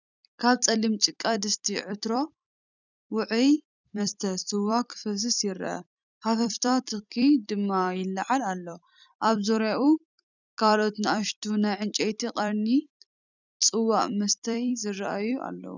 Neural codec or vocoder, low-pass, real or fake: vocoder, 44.1 kHz, 128 mel bands every 256 samples, BigVGAN v2; 7.2 kHz; fake